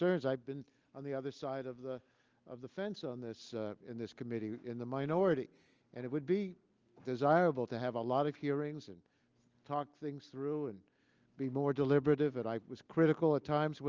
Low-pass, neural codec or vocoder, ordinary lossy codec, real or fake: 7.2 kHz; none; Opus, 24 kbps; real